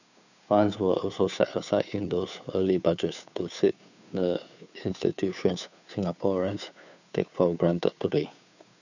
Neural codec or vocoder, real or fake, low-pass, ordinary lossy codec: codec, 16 kHz, 2 kbps, FunCodec, trained on Chinese and English, 25 frames a second; fake; 7.2 kHz; none